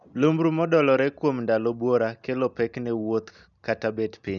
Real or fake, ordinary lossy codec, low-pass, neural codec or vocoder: real; none; 7.2 kHz; none